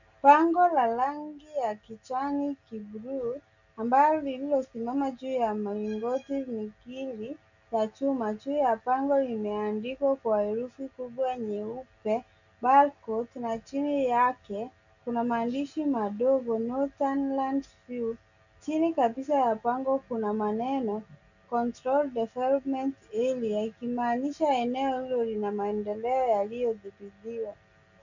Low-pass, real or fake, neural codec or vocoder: 7.2 kHz; real; none